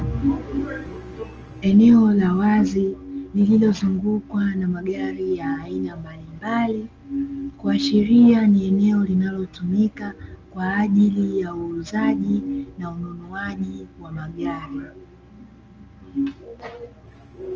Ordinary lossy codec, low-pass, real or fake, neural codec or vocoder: Opus, 24 kbps; 7.2 kHz; real; none